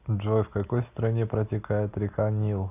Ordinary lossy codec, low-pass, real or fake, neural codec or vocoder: AAC, 32 kbps; 3.6 kHz; real; none